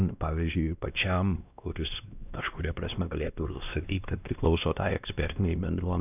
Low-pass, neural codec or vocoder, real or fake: 3.6 kHz; codec, 16 kHz, 1 kbps, X-Codec, WavLM features, trained on Multilingual LibriSpeech; fake